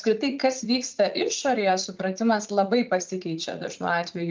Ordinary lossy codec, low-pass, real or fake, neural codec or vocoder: Opus, 24 kbps; 7.2 kHz; fake; vocoder, 44.1 kHz, 80 mel bands, Vocos